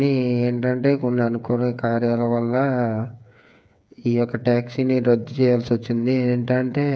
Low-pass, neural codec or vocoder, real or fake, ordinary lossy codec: none; codec, 16 kHz, 8 kbps, FreqCodec, smaller model; fake; none